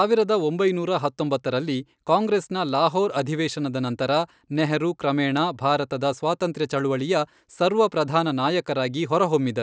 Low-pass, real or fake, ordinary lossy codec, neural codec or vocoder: none; real; none; none